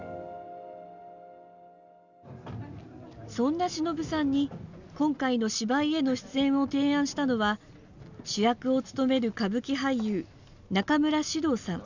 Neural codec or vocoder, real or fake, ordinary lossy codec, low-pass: none; real; none; 7.2 kHz